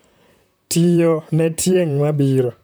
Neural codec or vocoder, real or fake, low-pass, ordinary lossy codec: vocoder, 44.1 kHz, 128 mel bands, Pupu-Vocoder; fake; none; none